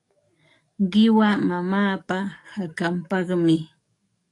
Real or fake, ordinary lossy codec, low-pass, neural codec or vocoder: fake; AAC, 48 kbps; 10.8 kHz; codec, 44.1 kHz, 7.8 kbps, DAC